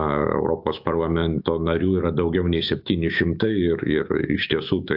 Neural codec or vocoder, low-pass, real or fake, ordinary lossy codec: autoencoder, 48 kHz, 128 numbers a frame, DAC-VAE, trained on Japanese speech; 5.4 kHz; fake; AAC, 48 kbps